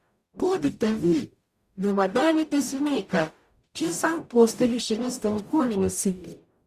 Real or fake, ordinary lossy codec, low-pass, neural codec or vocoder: fake; Opus, 64 kbps; 14.4 kHz; codec, 44.1 kHz, 0.9 kbps, DAC